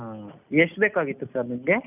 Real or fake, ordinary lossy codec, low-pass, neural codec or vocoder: real; none; 3.6 kHz; none